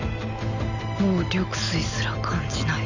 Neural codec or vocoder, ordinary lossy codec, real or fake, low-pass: none; none; real; 7.2 kHz